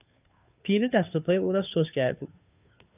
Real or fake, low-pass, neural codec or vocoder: fake; 3.6 kHz; codec, 16 kHz, 2 kbps, FunCodec, trained on Chinese and English, 25 frames a second